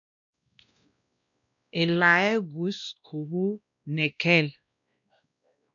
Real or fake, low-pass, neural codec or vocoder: fake; 7.2 kHz; codec, 16 kHz, 1 kbps, X-Codec, WavLM features, trained on Multilingual LibriSpeech